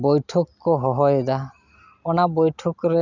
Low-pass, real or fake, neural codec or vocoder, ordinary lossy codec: 7.2 kHz; real; none; none